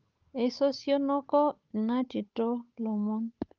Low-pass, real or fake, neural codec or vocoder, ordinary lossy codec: 7.2 kHz; fake; codec, 16 kHz, 8 kbps, FunCodec, trained on Chinese and English, 25 frames a second; Opus, 24 kbps